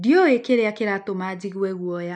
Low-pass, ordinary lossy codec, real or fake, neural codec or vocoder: 9.9 kHz; MP3, 96 kbps; real; none